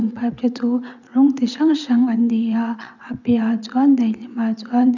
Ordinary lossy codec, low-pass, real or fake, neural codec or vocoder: none; 7.2 kHz; fake; vocoder, 22.05 kHz, 80 mel bands, WaveNeXt